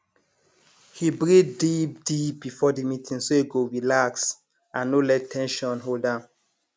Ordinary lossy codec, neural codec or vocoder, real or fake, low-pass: none; none; real; none